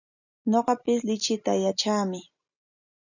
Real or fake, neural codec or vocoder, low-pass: real; none; 7.2 kHz